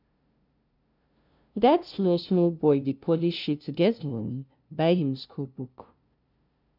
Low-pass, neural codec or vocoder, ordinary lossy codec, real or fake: 5.4 kHz; codec, 16 kHz, 0.5 kbps, FunCodec, trained on LibriTTS, 25 frames a second; none; fake